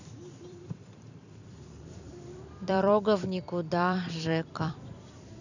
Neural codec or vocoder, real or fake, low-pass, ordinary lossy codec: vocoder, 44.1 kHz, 128 mel bands every 256 samples, BigVGAN v2; fake; 7.2 kHz; none